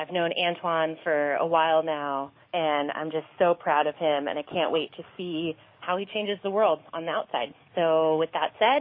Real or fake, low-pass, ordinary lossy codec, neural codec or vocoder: fake; 5.4 kHz; MP3, 24 kbps; codec, 44.1 kHz, 7.8 kbps, DAC